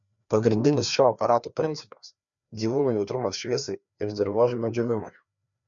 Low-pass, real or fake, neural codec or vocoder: 7.2 kHz; fake; codec, 16 kHz, 2 kbps, FreqCodec, larger model